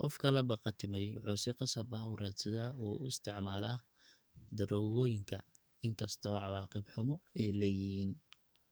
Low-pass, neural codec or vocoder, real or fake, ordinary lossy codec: none; codec, 44.1 kHz, 2.6 kbps, SNAC; fake; none